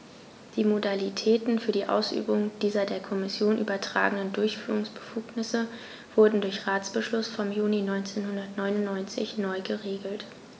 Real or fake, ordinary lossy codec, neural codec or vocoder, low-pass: real; none; none; none